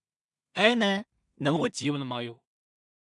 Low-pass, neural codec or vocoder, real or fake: 10.8 kHz; codec, 16 kHz in and 24 kHz out, 0.4 kbps, LongCat-Audio-Codec, two codebook decoder; fake